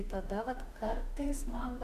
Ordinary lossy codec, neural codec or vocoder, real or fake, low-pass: MP3, 96 kbps; autoencoder, 48 kHz, 32 numbers a frame, DAC-VAE, trained on Japanese speech; fake; 14.4 kHz